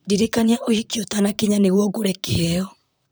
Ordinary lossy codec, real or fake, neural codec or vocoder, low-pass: none; fake; vocoder, 44.1 kHz, 128 mel bands, Pupu-Vocoder; none